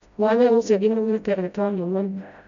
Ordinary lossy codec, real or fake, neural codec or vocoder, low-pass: MP3, 96 kbps; fake; codec, 16 kHz, 0.5 kbps, FreqCodec, smaller model; 7.2 kHz